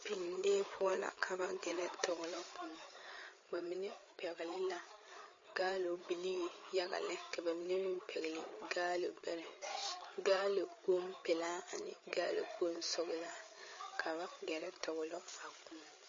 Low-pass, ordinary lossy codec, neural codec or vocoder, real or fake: 7.2 kHz; MP3, 32 kbps; codec, 16 kHz, 8 kbps, FreqCodec, larger model; fake